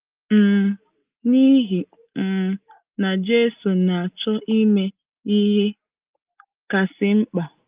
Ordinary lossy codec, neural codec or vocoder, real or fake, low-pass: Opus, 32 kbps; none; real; 3.6 kHz